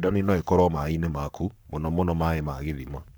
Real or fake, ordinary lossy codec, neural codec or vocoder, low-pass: fake; none; codec, 44.1 kHz, 7.8 kbps, Pupu-Codec; none